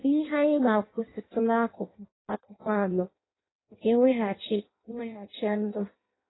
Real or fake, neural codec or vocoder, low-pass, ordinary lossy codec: fake; codec, 16 kHz in and 24 kHz out, 0.6 kbps, FireRedTTS-2 codec; 7.2 kHz; AAC, 16 kbps